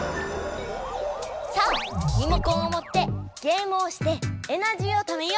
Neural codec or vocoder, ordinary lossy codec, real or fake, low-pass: none; none; real; none